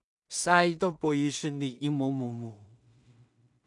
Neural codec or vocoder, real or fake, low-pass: codec, 16 kHz in and 24 kHz out, 0.4 kbps, LongCat-Audio-Codec, two codebook decoder; fake; 10.8 kHz